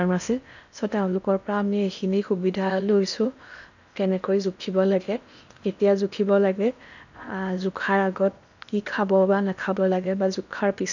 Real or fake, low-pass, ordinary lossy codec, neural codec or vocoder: fake; 7.2 kHz; none; codec, 16 kHz in and 24 kHz out, 0.8 kbps, FocalCodec, streaming, 65536 codes